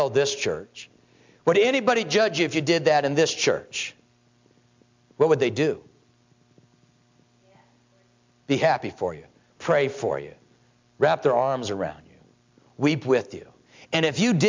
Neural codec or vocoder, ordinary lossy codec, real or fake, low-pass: none; MP3, 64 kbps; real; 7.2 kHz